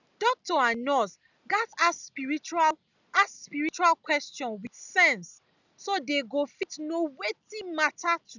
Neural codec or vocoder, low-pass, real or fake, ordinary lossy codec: none; 7.2 kHz; real; none